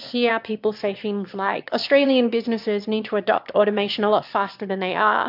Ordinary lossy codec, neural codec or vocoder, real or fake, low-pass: MP3, 48 kbps; autoencoder, 22.05 kHz, a latent of 192 numbers a frame, VITS, trained on one speaker; fake; 5.4 kHz